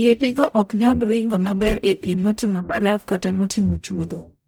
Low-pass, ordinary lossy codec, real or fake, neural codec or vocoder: none; none; fake; codec, 44.1 kHz, 0.9 kbps, DAC